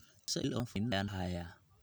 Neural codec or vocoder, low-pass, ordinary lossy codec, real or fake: none; none; none; real